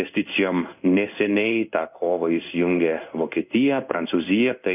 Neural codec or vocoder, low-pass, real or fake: codec, 16 kHz in and 24 kHz out, 1 kbps, XY-Tokenizer; 3.6 kHz; fake